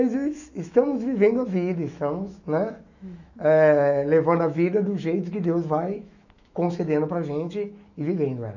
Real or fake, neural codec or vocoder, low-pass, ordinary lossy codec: fake; autoencoder, 48 kHz, 128 numbers a frame, DAC-VAE, trained on Japanese speech; 7.2 kHz; none